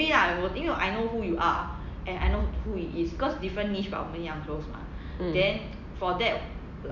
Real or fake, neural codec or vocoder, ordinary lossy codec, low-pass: real; none; none; 7.2 kHz